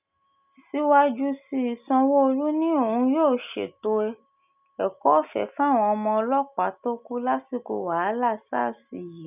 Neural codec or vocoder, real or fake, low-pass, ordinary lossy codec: none; real; 3.6 kHz; none